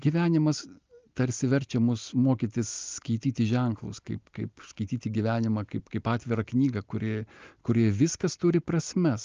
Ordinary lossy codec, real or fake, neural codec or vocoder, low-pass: Opus, 24 kbps; real; none; 7.2 kHz